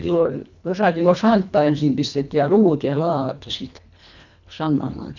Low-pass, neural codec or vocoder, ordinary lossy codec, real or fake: 7.2 kHz; codec, 24 kHz, 1.5 kbps, HILCodec; none; fake